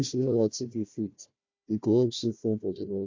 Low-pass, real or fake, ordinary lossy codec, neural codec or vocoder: 7.2 kHz; fake; MP3, 48 kbps; codec, 16 kHz, 1 kbps, FunCodec, trained on Chinese and English, 50 frames a second